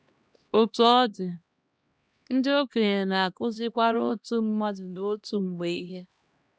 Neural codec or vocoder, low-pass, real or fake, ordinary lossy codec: codec, 16 kHz, 1 kbps, X-Codec, HuBERT features, trained on LibriSpeech; none; fake; none